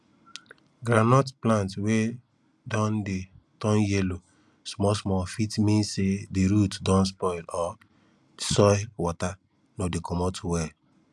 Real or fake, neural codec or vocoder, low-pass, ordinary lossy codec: real; none; none; none